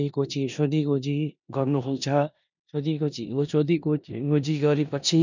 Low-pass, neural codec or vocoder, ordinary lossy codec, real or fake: 7.2 kHz; codec, 16 kHz in and 24 kHz out, 0.9 kbps, LongCat-Audio-Codec, four codebook decoder; none; fake